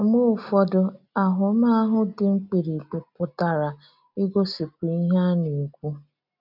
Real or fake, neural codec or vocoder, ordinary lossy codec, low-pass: real; none; none; 5.4 kHz